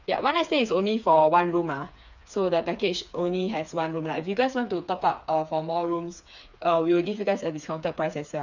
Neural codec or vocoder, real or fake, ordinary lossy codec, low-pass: codec, 16 kHz, 4 kbps, FreqCodec, smaller model; fake; none; 7.2 kHz